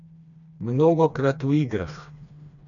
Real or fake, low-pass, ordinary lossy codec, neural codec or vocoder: fake; 7.2 kHz; none; codec, 16 kHz, 2 kbps, FreqCodec, smaller model